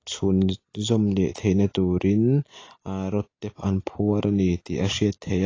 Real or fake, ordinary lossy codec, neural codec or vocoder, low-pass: real; AAC, 32 kbps; none; 7.2 kHz